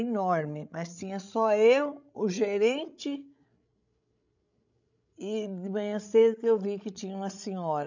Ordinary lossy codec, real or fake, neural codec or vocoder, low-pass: none; fake; codec, 16 kHz, 16 kbps, FreqCodec, larger model; 7.2 kHz